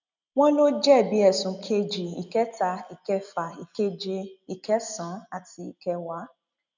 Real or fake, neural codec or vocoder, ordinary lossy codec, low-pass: real; none; none; 7.2 kHz